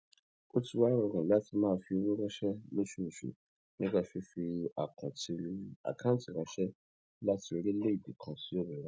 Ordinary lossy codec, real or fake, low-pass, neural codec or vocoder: none; real; none; none